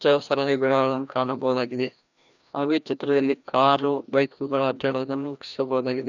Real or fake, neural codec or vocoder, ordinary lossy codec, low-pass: fake; codec, 16 kHz, 1 kbps, FreqCodec, larger model; none; 7.2 kHz